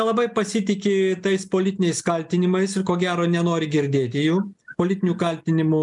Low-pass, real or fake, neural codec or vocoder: 10.8 kHz; real; none